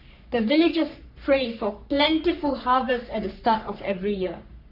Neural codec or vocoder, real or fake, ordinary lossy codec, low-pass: codec, 44.1 kHz, 3.4 kbps, Pupu-Codec; fake; none; 5.4 kHz